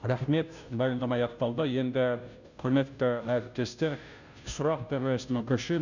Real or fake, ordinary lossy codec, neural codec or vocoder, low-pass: fake; none; codec, 16 kHz, 0.5 kbps, FunCodec, trained on Chinese and English, 25 frames a second; 7.2 kHz